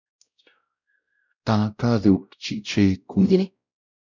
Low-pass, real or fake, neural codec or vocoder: 7.2 kHz; fake; codec, 16 kHz, 0.5 kbps, X-Codec, WavLM features, trained on Multilingual LibriSpeech